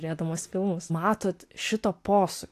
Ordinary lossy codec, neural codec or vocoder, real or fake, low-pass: AAC, 64 kbps; none; real; 14.4 kHz